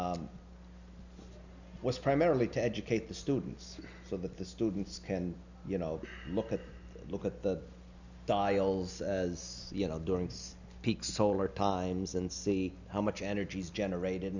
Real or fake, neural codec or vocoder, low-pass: real; none; 7.2 kHz